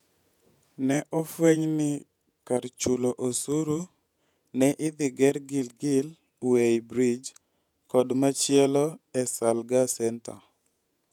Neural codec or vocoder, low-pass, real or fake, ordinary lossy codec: vocoder, 48 kHz, 128 mel bands, Vocos; 19.8 kHz; fake; none